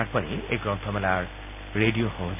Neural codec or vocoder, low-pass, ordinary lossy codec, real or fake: none; 3.6 kHz; MP3, 32 kbps; real